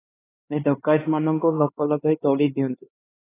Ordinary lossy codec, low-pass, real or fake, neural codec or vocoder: AAC, 16 kbps; 3.6 kHz; fake; codec, 16 kHz, 2 kbps, X-Codec, HuBERT features, trained on LibriSpeech